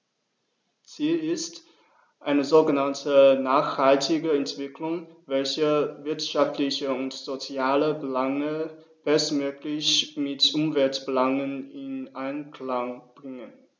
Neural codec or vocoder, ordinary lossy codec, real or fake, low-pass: none; none; real; none